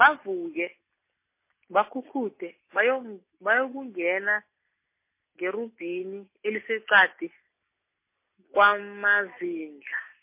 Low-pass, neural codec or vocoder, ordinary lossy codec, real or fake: 3.6 kHz; none; MP3, 24 kbps; real